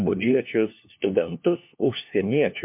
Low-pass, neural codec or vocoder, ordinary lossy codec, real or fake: 3.6 kHz; codec, 16 kHz, 1 kbps, FunCodec, trained on LibriTTS, 50 frames a second; MP3, 32 kbps; fake